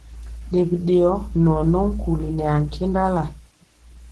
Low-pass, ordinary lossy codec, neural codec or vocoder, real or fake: 10.8 kHz; Opus, 16 kbps; codec, 44.1 kHz, 7.8 kbps, Pupu-Codec; fake